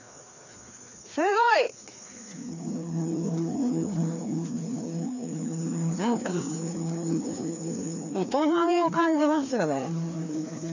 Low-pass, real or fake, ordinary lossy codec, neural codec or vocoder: 7.2 kHz; fake; none; codec, 16 kHz, 2 kbps, FreqCodec, larger model